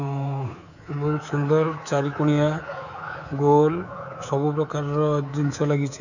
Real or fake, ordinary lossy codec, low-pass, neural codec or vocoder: fake; none; 7.2 kHz; codec, 24 kHz, 3.1 kbps, DualCodec